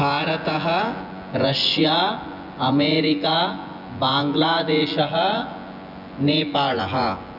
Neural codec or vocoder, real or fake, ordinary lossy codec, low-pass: vocoder, 24 kHz, 100 mel bands, Vocos; fake; none; 5.4 kHz